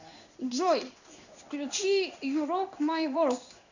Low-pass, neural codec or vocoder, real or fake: 7.2 kHz; codec, 16 kHz in and 24 kHz out, 1 kbps, XY-Tokenizer; fake